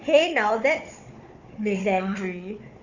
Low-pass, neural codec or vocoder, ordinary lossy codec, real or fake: 7.2 kHz; codec, 16 kHz, 4 kbps, FunCodec, trained on Chinese and English, 50 frames a second; none; fake